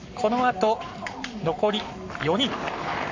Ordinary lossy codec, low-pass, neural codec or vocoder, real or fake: AAC, 48 kbps; 7.2 kHz; codec, 44.1 kHz, 7.8 kbps, Pupu-Codec; fake